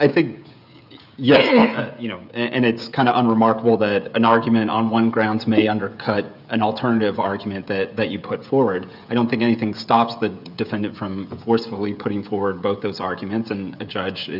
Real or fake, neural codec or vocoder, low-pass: fake; codec, 16 kHz, 16 kbps, FreqCodec, smaller model; 5.4 kHz